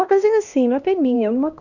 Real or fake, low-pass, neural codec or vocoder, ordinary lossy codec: fake; 7.2 kHz; codec, 16 kHz, 1 kbps, X-Codec, HuBERT features, trained on LibriSpeech; none